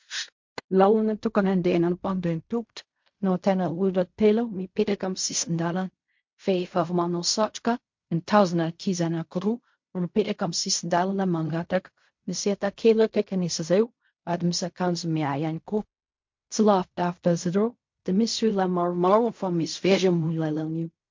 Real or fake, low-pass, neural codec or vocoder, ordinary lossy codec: fake; 7.2 kHz; codec, 16 kHz in and 24 kHz out, 0.4 kbps, LongCat-Audio-Codec, fine tuned four codebook decoder; MP3, 48 kbps